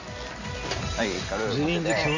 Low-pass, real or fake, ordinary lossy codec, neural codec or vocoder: 7.2 kHz; real; Opus, 64 kbps; none